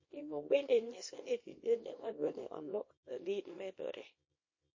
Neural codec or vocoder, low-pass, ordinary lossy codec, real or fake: codec, 24 kHz, 0.9 kbps, WavTokenizer, small release; 7.2 kHz; MP3, 32 kbps; fake